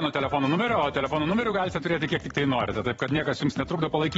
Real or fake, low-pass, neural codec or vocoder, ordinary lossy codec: fake; 19.8 kHz; vocoder, 44.1 kHz, 128 mel bands every 256 samples, BigVGAN v2; AAC, 24 kbps